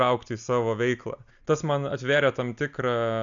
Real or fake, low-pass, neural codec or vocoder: real; 7.2 kHz; none